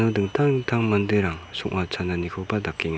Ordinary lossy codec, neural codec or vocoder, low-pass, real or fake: none; none; none; real